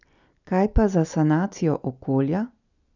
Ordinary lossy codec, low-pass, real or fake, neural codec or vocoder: none; 7.2 kHz; real; none